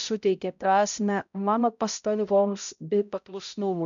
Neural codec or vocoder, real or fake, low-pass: codec, 16 kHz, 0.5 kbps, X-Codec, HuBERT features, trained on balanced general audio; fake; 7.2 kHz